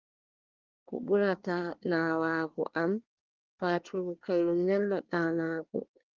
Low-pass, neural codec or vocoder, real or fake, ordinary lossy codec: 7.2 kHz; codec, 24 kHz, 1 kbps, SNAC; fake; Opus, 24 kbps